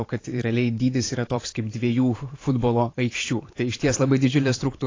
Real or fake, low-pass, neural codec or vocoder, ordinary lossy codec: fake; 7.2 kHz; vocoder, 44.1 kHz, 128 mel bands every 512 samples, BigVGAN v2; AAC, 32 kbps